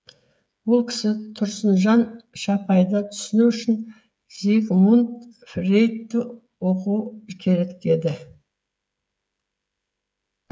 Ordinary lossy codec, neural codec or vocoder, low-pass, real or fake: none; codec, 16 kHz, 16 kbps, FreqCodec, smaller model; none; fake